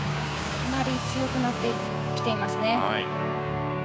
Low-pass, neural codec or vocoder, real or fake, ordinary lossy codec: none; codec, 16 kHz, 6 kbps, DAC; fake; none